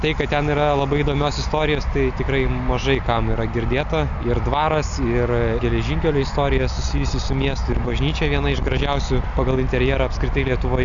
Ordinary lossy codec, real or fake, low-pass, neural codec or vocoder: MP3, 96 kbps; real; 7.2 kHz; none